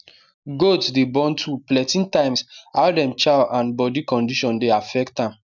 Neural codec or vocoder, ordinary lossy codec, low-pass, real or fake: none; none; 7.2 kHz; real